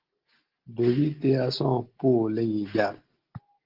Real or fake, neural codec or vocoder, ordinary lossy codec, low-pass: real; none; Opus, 16 kbps; 5.4 kHz